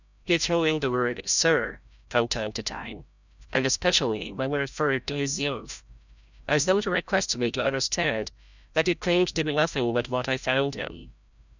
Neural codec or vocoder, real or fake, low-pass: codec, 16 kHz, 0.5 kbps, FreqCodec, larger model; fake; 7.2 kHz